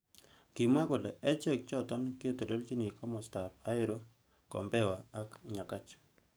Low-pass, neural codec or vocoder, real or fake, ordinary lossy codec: none; codec, 44.1 kHz, 7.8 kbps, DAC; fake; none